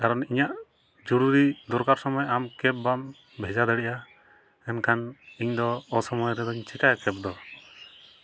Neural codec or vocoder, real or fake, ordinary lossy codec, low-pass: none; real; none; none